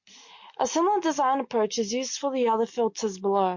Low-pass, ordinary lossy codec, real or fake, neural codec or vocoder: 7.2 kHz; MP3, 48 kbps; real; none